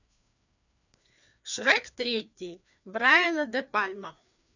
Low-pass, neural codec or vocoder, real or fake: 7.2 kHz; codec, 16 kHz, 2 kbps, FreqCodec, larger model; fake